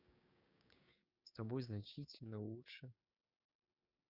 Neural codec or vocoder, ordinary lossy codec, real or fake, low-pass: codec, 16 kHz, 6 kbps, DAC; Opus, 64 kbps; fake; 5.4 kHz